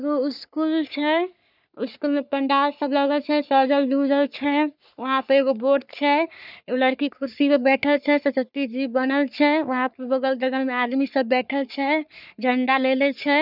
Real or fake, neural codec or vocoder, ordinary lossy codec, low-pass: fake; codec, 44.1 kHz, 3.4 kbps, Pupu-Codec; none; 5.4 kHz